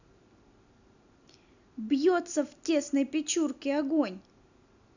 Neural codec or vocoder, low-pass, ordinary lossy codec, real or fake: none; 7.2 kHz; none; real